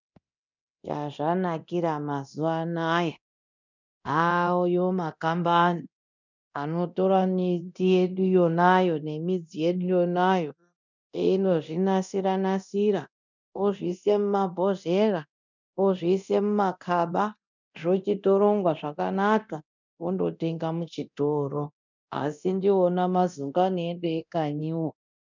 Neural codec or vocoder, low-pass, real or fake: codec, 24 kHz, 0.9 kbps, DualCodec; 7.2 kHz; fake